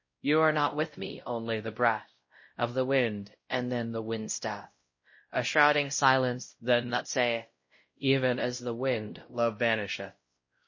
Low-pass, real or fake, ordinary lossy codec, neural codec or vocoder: 7.2 kHz; fake; MP3, 32 kbps; codec, 16 kHz, 0.5 kbps, X-Codec, WavLM features, trained on Multilingual LibriSpeech